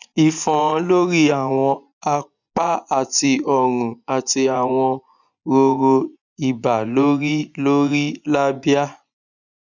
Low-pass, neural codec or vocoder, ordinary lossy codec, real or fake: 7.2 kHz; vocoder, 24 kHz, 100 mel bands, Vocos; none; fake